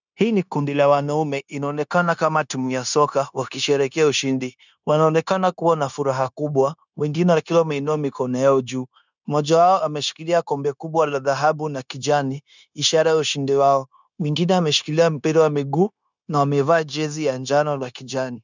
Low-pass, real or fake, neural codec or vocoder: 7.2 kHz; fake; codec, 16 kHz, 0.9 kbps, LongCat-Audio-Codec